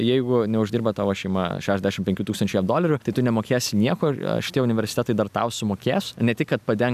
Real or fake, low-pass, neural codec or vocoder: real; 14.4 kHz; none